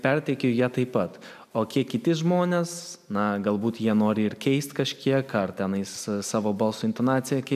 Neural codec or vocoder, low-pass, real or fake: none; 14.4 kHz; real